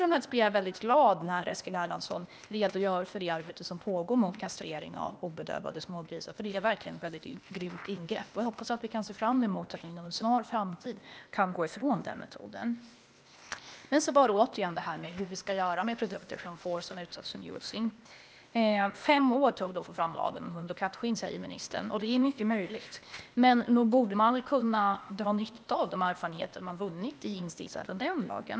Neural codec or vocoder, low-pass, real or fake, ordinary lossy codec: codec, 16 kHz, 0.8 kbps, ZipCodec; none; fake; none